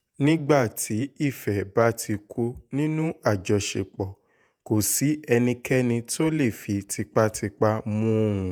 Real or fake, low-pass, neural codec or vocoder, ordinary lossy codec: fake; none; vocoder, 48 kHz, 128 mel bands, Vocos; none